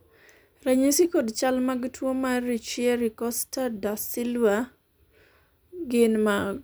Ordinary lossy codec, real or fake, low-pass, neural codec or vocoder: none; real; none; none